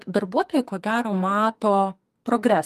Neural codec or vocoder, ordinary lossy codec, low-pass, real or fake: codec, 44.1 kHz, 2.6 kbps, SNAC; Opus, 32 kbps; 14.4 kHz; fake